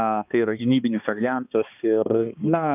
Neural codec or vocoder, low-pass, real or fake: codec, 16 kHz, 2 kbps, X-Codec, HuBERT features, trained on balanced general audio; 3.6 kHz; fake